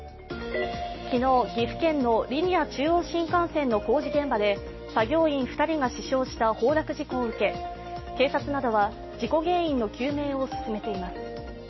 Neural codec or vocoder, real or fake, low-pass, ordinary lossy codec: none; real; 7.2 kHz; MP3, 24 kbps